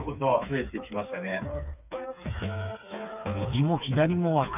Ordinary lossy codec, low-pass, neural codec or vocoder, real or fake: none; 3.6 kHz; codec, 16 kHz, 4 kbps, FreqCodec, smaller model; fake